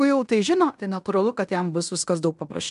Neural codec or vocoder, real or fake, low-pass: codec, 16 kHz in and 24 kHz out, 0.9 kbps, LongCat-Audio-Codec, fine tuned four codebook decoder; fake; 10.8 kHz